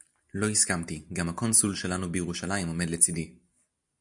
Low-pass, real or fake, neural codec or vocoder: 10.8 kHz; real; none